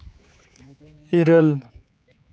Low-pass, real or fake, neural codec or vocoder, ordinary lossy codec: none; fake; codec, 16 kHz, 4 kbps, X-Codec, HuBERT features, trained on general audio; none